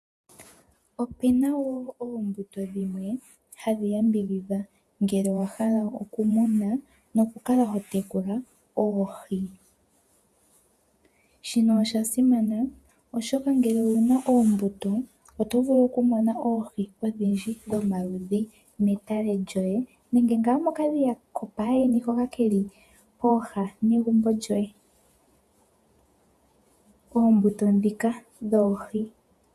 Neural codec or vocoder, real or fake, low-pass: vocoder, 48 kHz, 128 mel bands, Vocos; fake; 14.4 kHz